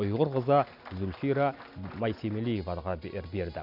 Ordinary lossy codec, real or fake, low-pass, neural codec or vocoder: none; real; 5.4 kHz; none